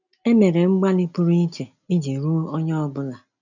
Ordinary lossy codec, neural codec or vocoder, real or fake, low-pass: none; none; real; 7.2 kHz